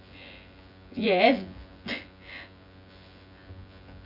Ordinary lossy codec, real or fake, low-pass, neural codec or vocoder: none; fake; 5.4 kHz; vocoder, 24 kHz, 100 mel bands, Vocos